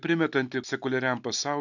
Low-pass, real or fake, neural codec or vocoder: 7.2 kHz; real; none